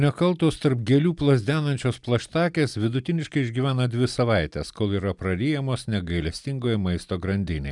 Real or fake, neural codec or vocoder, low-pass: real; none; 10.8 kHz